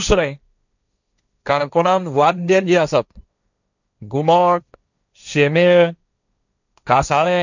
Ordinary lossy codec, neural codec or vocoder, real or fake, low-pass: none; codec, 16 kHz, 1.1 kbps, Voila-Tokenizer; fake; 7.2 kHz